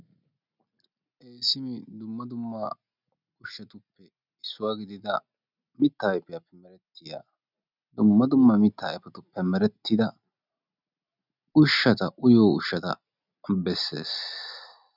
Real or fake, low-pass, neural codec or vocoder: real; 5.4 kHz; none